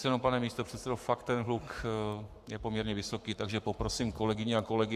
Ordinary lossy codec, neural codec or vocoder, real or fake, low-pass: Opus, 64 kbps; codec, 44.1 kHz, 7.8 kbps, Pupu-Codec; fake; 14.4 kHz